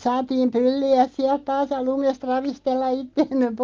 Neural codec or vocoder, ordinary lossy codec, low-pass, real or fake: none; Opus, 32 kbps; 7.2 kHz; real